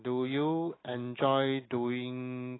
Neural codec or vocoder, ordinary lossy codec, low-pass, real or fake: none; AAC, 16 kbps; 7.2 kHz; real